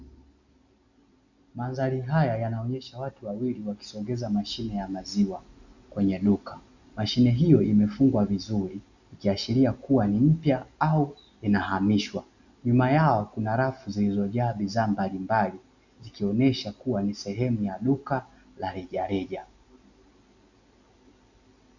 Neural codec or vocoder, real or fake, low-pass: none; real; 7.2 kHz